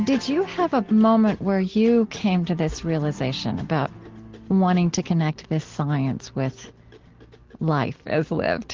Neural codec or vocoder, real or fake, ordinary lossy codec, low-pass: none; real; Opus, 16 kbps; 7.2 kHz